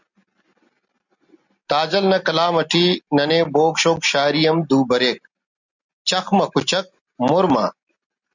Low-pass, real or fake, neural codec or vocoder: 7.2 kHz; real; none